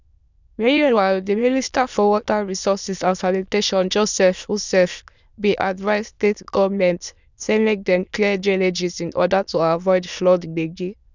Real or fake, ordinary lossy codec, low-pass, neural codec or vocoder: fake; none; 7.2 kHz; autoencoder, 22.05 kHz, a latent of 192 numbers a frame, VITS, trained on many speakers